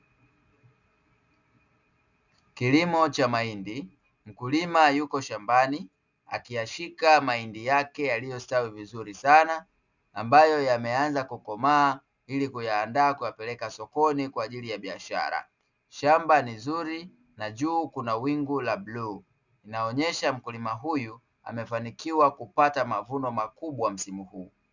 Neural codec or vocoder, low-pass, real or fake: none; 7.2 kHz; real